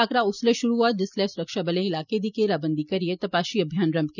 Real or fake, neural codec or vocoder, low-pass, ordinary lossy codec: real; none; 7.2 kHz; none